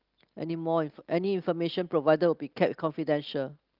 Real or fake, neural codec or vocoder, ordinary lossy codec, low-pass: real; none; Opus, 32 kbps; 5.4 kHz